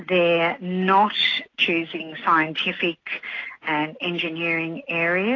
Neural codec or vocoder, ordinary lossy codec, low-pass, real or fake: none; AAC, 32 kbps; 7.2 kHz; real